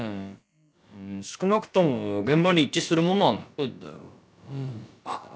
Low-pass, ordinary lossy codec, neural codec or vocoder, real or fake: none; none; codec, 16 kHz, about 1 kbps, DyCAST, with the encoder's durations; fake